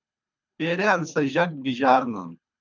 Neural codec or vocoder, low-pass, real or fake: codec, 24 kHz, 3 kbps, HILCodec; 7.2 kHz; fake